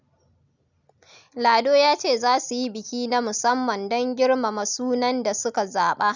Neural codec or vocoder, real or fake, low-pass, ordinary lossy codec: none; real; 7.2 kHz; none